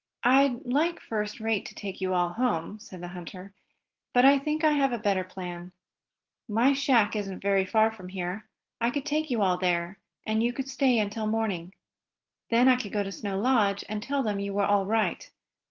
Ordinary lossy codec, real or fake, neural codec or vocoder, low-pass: Opus, 16 kbps; real; none; 7.2 kHz